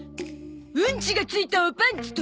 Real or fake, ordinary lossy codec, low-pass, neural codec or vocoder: real; none; none; none